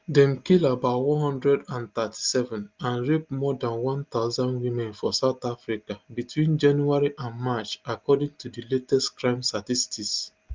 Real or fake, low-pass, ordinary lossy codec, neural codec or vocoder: real; 7.2 kHz; Opus, 32 kbps; none